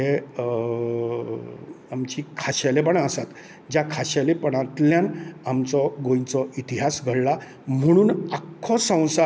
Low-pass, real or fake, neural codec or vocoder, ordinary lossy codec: none; real; none; none